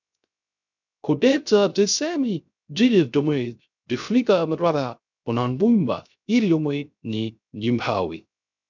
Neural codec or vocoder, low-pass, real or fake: codec, 16 kHz, 0.3 kbps, FocalCodec; 7.2 kHz; fake